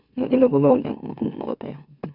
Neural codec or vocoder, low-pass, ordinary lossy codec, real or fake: autoencoder, 44.1 kHz, a latent of 192 numbers a frame, MeloTTS; 5.4 kHz; none; fake